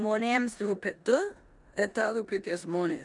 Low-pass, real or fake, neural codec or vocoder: 10.8 kHz; fake; codec, 16 kHz in and 24 kHz out, 0.9 kbps, LongCat-Audio-Codec, four codebook decoder